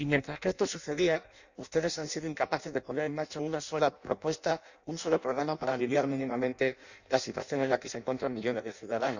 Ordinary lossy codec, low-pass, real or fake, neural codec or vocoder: none; 7.2 kHz; fake; codec, 16 kHz in and 24 kHz out, 0.6 kbps, FireRedTTS-2 codec